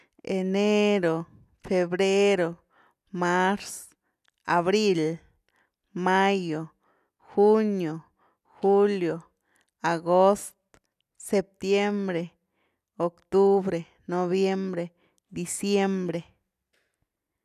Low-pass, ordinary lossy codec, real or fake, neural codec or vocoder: 14.4 kHz; none; real; none